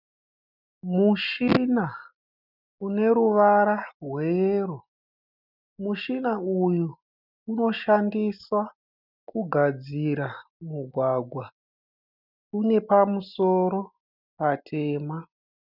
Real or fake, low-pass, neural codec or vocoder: real; 5.4 kHz; none